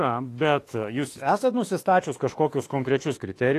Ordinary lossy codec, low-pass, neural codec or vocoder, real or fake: AAC, 48 kbps; 14.4 kHz; autoencoder, 48 kHz, 32 numbers a frame, DAC-VAE, trained on Japanese speech; fake